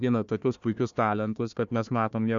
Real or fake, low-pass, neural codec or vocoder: fake; 7.2 kHz; codec, 16 kHz, 1 kbps, FunCodec, trained on Chinese and English, 50 frames a second